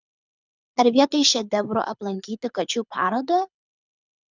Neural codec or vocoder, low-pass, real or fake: codec, 24 kHz, 6 kbps, HILCodec; 7.2 kHz; fake